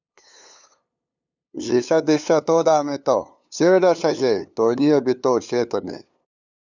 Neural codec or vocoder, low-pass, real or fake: codec, 16 kHz, 2 kbps, FunCodec, trained on LibriTTS, 25 frames a second; 7.2 kHz; fake